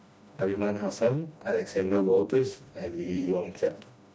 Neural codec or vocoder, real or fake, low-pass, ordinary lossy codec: codec, 16 kHz, 1 kbps, FreqCodec, smaller model; fake; none; none